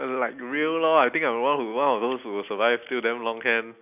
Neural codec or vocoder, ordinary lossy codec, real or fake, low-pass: none; none; real; 3.6 kHz